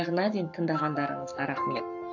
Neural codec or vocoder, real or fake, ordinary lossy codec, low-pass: codec, 44.1 kHz, 7.8 kbps, Pupu-Codec; fake; none; 7.2 kHz